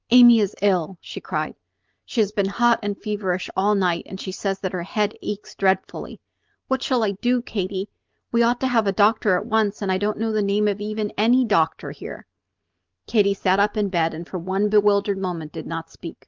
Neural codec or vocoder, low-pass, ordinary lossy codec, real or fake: none; 7.2 kHz; Opus, 24 kbps; real